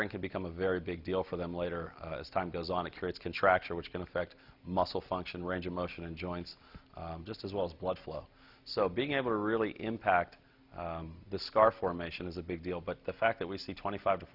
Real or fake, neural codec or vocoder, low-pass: real; none; 5.4 kHz